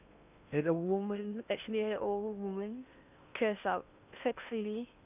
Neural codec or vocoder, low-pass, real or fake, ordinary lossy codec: codec, 16 kHz in and 24 kHz out, 0.8 kbps, FocalCodec, streaming, 65536 codes; 3.6 kHz; fake; none